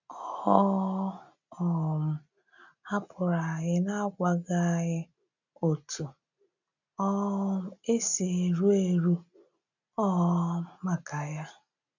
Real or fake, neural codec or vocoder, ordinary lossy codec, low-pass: real; none; none; 7.2 kHz